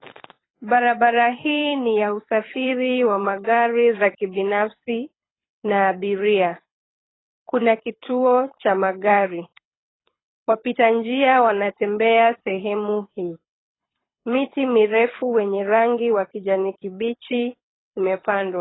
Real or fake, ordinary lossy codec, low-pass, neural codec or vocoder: fake; AAC, 16 kbps; 7.2 kHz; codec, 24 kHz, 6 kbps, HILCodec